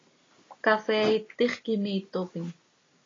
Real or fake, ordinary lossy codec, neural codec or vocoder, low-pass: real; AAC, 32 kbps; none; 7.2 kHz